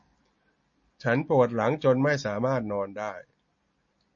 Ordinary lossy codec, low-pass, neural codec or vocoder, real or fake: MP3, 32 kbps; 7.2 kHz; none; real